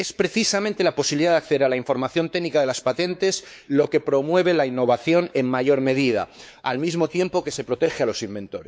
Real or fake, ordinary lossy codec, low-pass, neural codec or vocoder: fake; none; none; codec, 16 kHz, 4 kbps, X-Codec, WavLM features, trained on Multilingual LibriSpeech